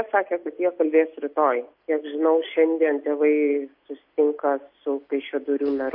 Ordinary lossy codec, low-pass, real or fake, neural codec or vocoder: MP3, 32 kbps; 5.4 kHz; real; none